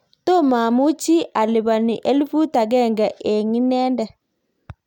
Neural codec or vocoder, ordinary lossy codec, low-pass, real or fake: none; none; 19.8 kHz; real